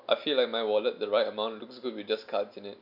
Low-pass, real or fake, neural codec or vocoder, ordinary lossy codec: 5.4 kHz; real; none; none